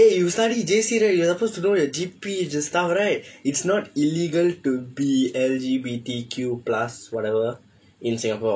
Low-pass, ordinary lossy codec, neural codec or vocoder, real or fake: none; none; none; real